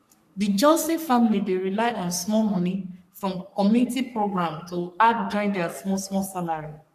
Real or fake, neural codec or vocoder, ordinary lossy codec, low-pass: fake; codec, 44.1 kHz, 3.4 kbps, Pupu-Codec; none; 14.4 kHz